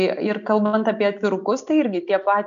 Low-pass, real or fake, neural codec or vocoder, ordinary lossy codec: 7.2 kHz; real; none; AAC, 96 kbps